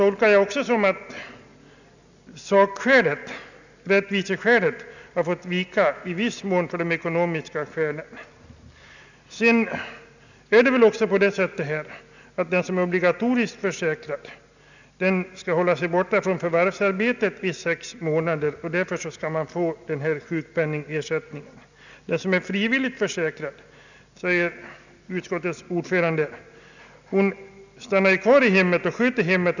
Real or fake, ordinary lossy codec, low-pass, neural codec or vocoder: real; none; 7.2 kHz; none